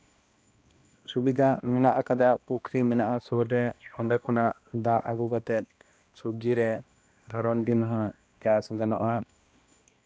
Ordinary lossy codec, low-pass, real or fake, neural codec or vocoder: none; none; fake; codec, 16 kHz, 1 kbps, X-Codec, HuBERT features, trained on balanced general audio